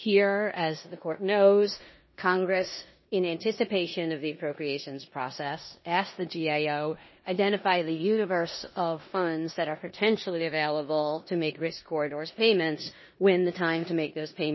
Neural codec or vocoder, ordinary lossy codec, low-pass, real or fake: codec, 16 kHz in and 24 kHz out, 0.9 kbps, LongCat-Audio-Codec, four codebook decoder; MP3, 24 kbps; 7.2 kHz; fake